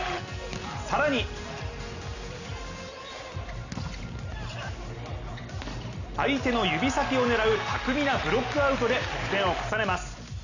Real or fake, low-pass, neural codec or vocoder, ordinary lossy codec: real; 7.2 kHz; none; none